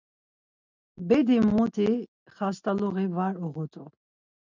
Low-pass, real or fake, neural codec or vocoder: 7.2 kHz; real; none